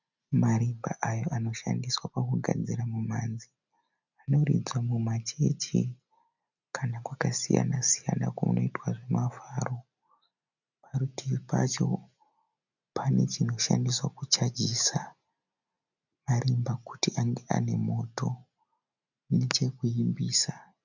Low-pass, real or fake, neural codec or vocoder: 7.2 kHz; real; none